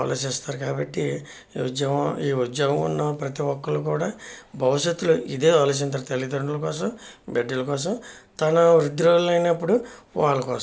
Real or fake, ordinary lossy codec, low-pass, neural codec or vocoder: real; none; none; none